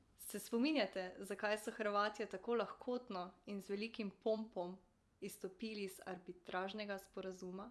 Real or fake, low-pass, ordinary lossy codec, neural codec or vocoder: fake; none; none; vocoder, 24 kHz, 100 mel bands, Vocos